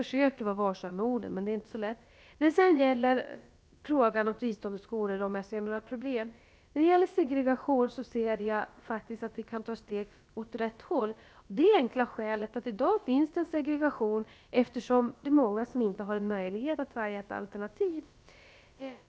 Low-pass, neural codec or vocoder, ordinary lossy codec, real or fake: none; codec, 16 kHz, about 1 kbps, DyCAST, with the encoder's durations; none; fake